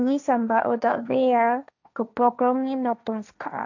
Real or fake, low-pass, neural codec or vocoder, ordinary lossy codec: fake; 7.2 kHz; codec, 16 kHz, 1.1 kbps, Voila-Tokenizer; none